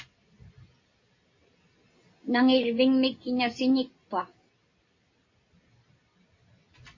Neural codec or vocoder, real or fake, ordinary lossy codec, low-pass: none; real; MP3, 32 kbps; 7.2 kHz